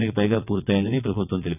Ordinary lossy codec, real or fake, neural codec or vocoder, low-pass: none; fake; vocoder, 22.05 kHz, 80 mel bands, WaveNeXt; 3.6 kHz